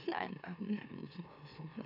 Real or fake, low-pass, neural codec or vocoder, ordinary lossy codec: fake; 5.4 kHz; autoencoder, 44.1 kHz, a latent of 192 numbers a frame, MeloTTS; none